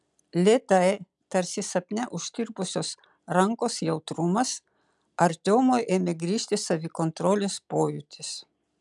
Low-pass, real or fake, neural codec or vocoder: 10.8 kHz; real; none